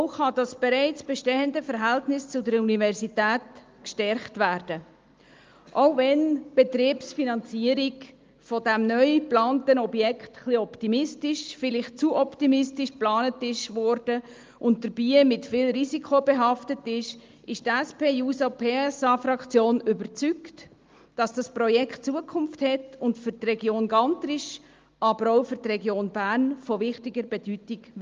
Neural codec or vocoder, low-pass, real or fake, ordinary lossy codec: none; 7.2 kHz; real; Opus, 32 kbps